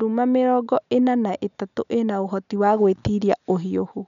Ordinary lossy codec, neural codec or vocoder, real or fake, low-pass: none; none; real; 7.2 kHz